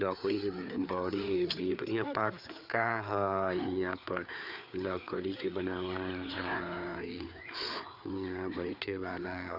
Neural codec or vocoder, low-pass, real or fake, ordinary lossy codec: codec, 16 kHz, 4 kbps, FreqCodec, larger model; 5.4 kHz; fake; none